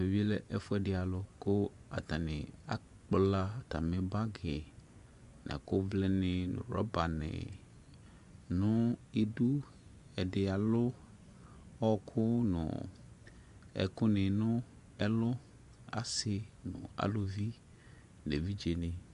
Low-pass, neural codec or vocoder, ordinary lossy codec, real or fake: 10.8 kHz; vocoder, 24 kHz, 100 mel bands, Vocos; MP3, 64 kbps; fake